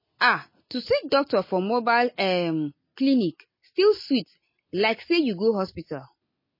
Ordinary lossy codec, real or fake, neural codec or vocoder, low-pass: MP3, 24 kbps; real; none; 5.4 kHz